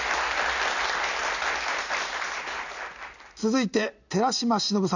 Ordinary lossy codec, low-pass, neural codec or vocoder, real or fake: none; 7.2 kHz; none; real